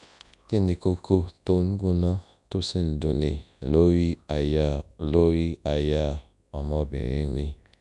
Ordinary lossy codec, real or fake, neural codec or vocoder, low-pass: MP3, 96 kbps; fake; codec, 24 kHz, 0.9 kbps, WavTokenizer, large speech release; 10.8 kHz